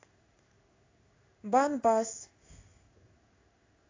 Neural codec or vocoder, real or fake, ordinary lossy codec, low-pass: codec, 16 kHz in and 24 kHz out, 1 kbps, XY-Tokenizer; fake; AAC, 32 kbps; 7.2 kHz